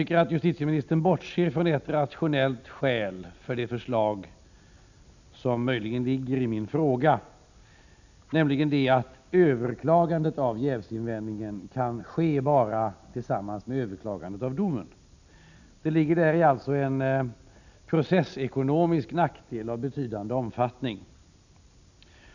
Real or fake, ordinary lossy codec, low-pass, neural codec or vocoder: real; none; 7.2 kHz; none